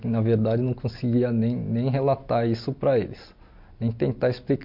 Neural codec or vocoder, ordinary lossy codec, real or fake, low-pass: none; MP3, 48 kbps; real; 5.4 kHz